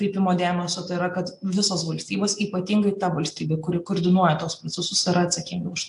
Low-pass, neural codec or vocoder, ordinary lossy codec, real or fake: 10.8 kHz; none; AAC, 64 kbps; real